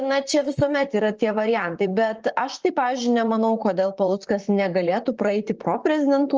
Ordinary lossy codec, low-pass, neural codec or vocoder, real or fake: Opus, 24 kbps; 7.2 kHz; vocoder, 44.1 kHz, 128 mel bands, Pupu-Vocoder; fake